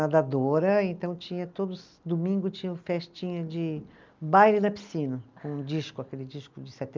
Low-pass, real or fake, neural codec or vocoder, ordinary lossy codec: 7.2 kHz; real; none; Opus, 24 kbps